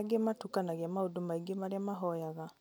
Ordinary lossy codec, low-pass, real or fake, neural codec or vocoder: none; none; real; none